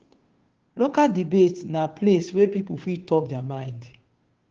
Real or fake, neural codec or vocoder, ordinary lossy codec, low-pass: fake; codec, 16 kHz, 2 kbps, FunCodec, trained on Chinese and English, 25 frames a second; Opus, 16 kbps; 7.2 kHz